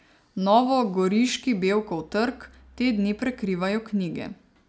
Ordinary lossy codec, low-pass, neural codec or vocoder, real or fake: none; none; none; real